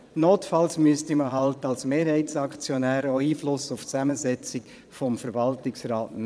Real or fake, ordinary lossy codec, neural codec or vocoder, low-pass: fake; none; vocoder, 22.05 kHz, 80 mel bands, Vocos; none